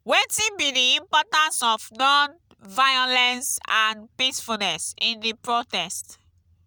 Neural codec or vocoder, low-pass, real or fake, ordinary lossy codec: none; none; real; none